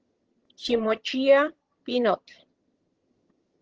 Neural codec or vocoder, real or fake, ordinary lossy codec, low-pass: vocoder, 22.05 kHz, 80 mel bands, HiFi-GAN; fake; Opus, 16 kbps; 7.2 kHz